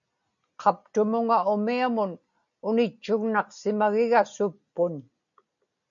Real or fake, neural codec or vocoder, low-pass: real; none; 7.2 kHz